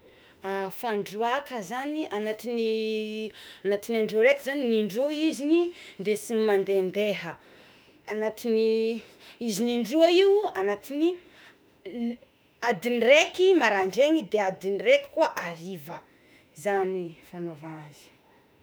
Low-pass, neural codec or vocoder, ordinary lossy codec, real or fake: none; autoencoder, 48 kHz, 32 numbers a frame, DAC-VAE, trained on Japanese speech; none; fake